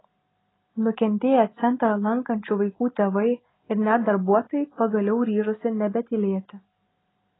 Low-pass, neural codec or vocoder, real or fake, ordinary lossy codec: 7.2 kHz; none; real; AAC, 16 kbps